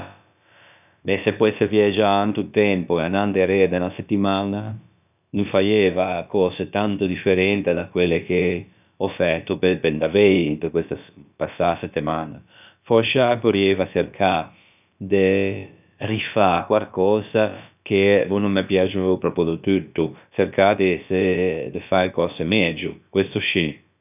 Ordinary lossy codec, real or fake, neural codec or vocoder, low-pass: none; fake; codec, 16 kHz, about 1 kbps, DyCAST, with the encoder's durations; 3.6 kHz